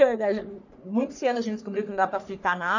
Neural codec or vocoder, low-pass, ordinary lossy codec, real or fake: codec, 44.1 kHz, 3.4 kbps, Pupu-Codec; 7.2 kHz; none; fake